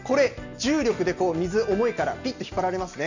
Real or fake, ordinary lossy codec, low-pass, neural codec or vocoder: real; none; 7.2 kHz; none